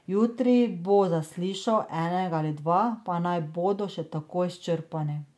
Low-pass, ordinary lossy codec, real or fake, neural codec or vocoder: none; none; real; none